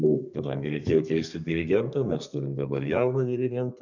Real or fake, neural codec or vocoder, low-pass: fake; codec, 32 kHz, 1.9 kbps, SNAC; 7.2 kHz